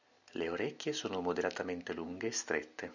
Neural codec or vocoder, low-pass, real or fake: none; 7.2 kHz; real